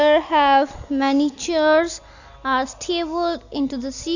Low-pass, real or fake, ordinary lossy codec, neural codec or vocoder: 7.2 kHz; real; none; none